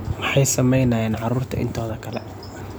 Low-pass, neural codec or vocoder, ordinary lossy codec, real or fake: none; none; none; real